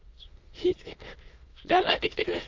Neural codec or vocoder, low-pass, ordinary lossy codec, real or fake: autoencoder, 22.05 kHz, a latent of 192 numbers a frame, VITS, trained on many speakers; 7.2 kHz; Opus, 24 kbps; fake